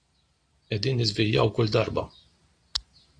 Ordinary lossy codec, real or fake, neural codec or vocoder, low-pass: AAC, 48 kbps; real; none; 9.9 kHz